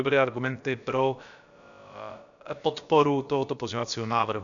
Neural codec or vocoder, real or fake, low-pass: codec, 16 kHz, about 1 kbps, DyCAST, with the encoder's durations; fake; 7.2 kHz